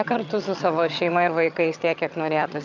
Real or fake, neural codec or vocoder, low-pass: fake; vocoder, 22.05 kHz, 80 mel bands, HiFi-GAN; 7.2 kHz